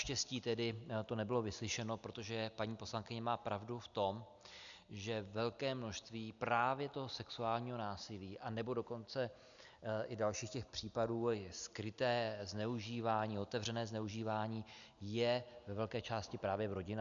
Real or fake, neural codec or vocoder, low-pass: real; none; 7.2 kHz